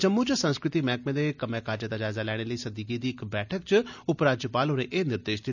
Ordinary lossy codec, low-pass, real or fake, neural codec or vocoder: none; 7.2 kHz; real; none